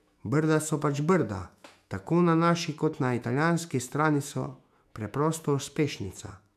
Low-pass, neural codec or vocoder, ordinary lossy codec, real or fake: 14.4 kHz; autoencoder, 48 kHz, 128 numbers a frame, DAC-VAE, trained on Japanese speech; none; fake